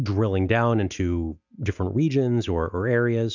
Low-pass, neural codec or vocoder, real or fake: 7.2 kHz; none; real